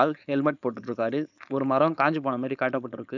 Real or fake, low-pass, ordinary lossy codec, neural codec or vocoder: fake; 7.2 kHz; none; codec, 16 kHz, 4.8 kbps, FACodec